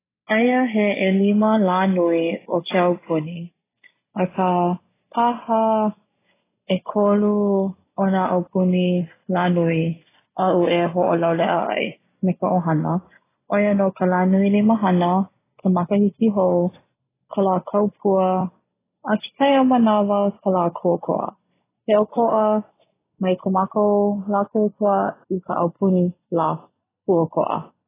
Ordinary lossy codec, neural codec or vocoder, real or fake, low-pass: AAC, 16 kbps; none; real; 3.6 kHz